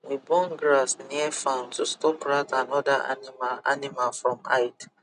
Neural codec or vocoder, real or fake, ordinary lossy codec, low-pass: none; real; none; 10.8 kHz